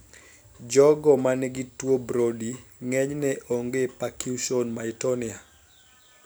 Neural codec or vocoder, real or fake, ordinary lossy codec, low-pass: none; real; none; none